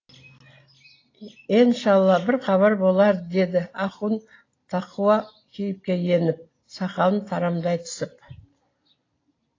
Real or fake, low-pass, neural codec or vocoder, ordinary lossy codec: real; 7.2 kHz; none; AAC, 32 kbps